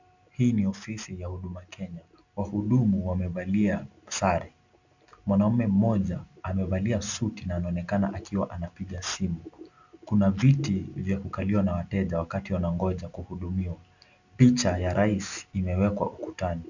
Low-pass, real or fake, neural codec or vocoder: 7.2 kHz; real; none